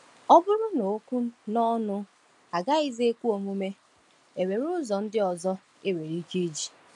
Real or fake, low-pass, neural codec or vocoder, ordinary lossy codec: real; 10.8 kHz; none; none